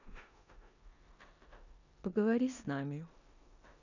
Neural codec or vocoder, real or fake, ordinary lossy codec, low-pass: codec, 16 kHz in and 24 kHz out, 0.9 kbps, LongCat-Audio-Codec, four codebook decoder; fake; none; 7.2 kHz